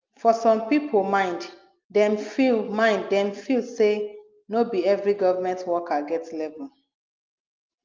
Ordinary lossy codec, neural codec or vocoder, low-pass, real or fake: Opus, 24 kbps; none; 7.2 kHz; real